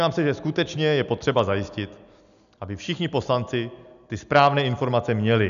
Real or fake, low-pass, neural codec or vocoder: real; 7.2 kHz; none